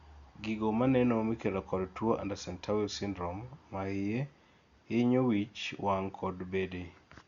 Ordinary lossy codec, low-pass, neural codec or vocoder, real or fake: Opus, 64 kbps; 7.2 kHz; none; real